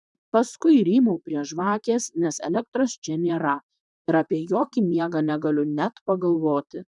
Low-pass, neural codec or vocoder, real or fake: 10.8 kHz; vocoder, 44.1 kHz, 128 mel bands, Pupu-Vocoder; fake